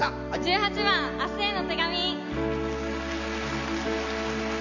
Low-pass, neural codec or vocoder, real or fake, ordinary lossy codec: 7.2 kHz; none; real; none